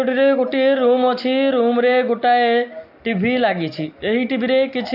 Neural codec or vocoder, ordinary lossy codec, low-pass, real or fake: none; AAC, 48 kbps; 5.4 kHz; real